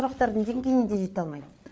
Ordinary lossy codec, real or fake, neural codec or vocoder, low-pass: none; fake; codec, 16 kHz, 8 kbps, FreqCodec, larger model; none